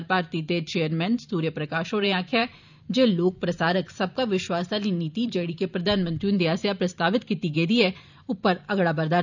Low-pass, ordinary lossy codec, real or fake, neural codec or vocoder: 7.2 kHz; none; fake; vocoder, 44.1 kHz, 128 mel bands every 512 samples, BigVGAN v2